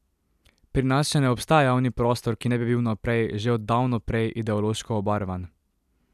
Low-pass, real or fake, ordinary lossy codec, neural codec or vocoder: 14.4 kHz; fake; none; vocoder, 44.1 kHz, 128 mel bands every 256 samples, BigVGAN v2